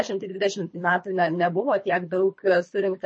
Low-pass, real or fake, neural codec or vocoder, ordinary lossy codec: 9.9 kHz; fake; codec, 24 kHz, 3 kbps, HILCodec; MP3, 32 kbps